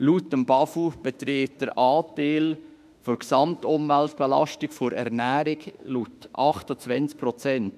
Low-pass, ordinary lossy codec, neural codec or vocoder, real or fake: 14.4 kHz; none; autoencoder, 48 kHz, 32 numbers a frame, DAC-VAE, trained on Japanese speech; fake